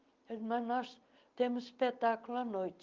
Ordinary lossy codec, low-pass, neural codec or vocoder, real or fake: Opus, 32 kbps; 7.2 kHz; none; real